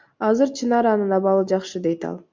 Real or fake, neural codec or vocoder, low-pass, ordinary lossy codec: real; none; 7.2 kHz; MP3, 64 kbps